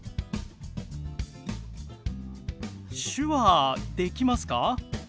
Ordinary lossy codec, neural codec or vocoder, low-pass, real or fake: none; none; none; real